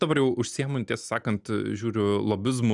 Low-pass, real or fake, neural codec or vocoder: 10.8 kHz; real; none